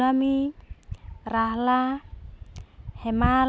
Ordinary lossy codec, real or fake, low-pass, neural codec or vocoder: none; real; none; none